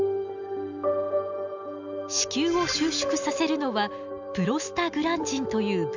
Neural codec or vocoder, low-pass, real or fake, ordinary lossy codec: none; 7.2 kHz; real; none